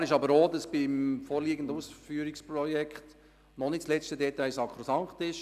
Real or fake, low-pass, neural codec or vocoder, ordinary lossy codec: real; 14.4 kHz; none; none